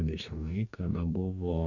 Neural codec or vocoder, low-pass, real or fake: codec, 44.1 kHz, 2.6 kbps, DAC; 7.2 kHz; fake